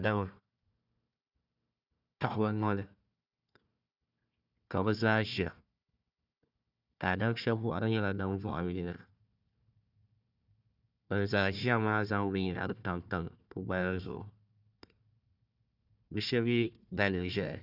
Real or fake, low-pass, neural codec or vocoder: fake; 5.4 kHz; codec, 16 kHz, 1 kbps, FunCodec, trained on Chinese and English, 50 frames a second